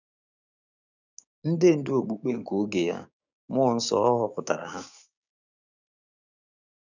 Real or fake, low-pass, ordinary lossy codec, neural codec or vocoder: fake; 7.2 kHz; none; codec, 16 kHz, 6 kbps, DAC